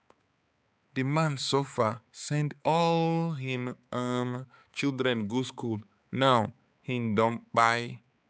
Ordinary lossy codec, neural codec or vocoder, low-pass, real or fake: none; codec, 16 kHz, 4 kbps, X-Codec, HuBERT features, trained on balanced general audio; none; fake